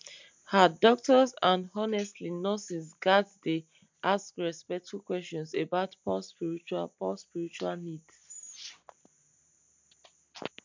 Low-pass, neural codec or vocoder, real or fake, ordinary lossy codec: 7.2 kHz; none; real; MP3, 64 kbps